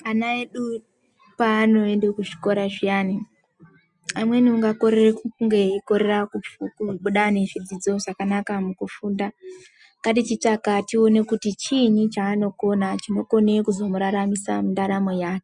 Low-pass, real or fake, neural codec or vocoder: 10.8 kHz; real; none